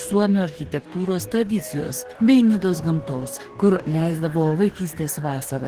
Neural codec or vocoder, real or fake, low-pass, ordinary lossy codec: codec, 44.1 kHz, 2.6 kbps, DAC; fake; 14.4 kHz; Opus, 16 kbps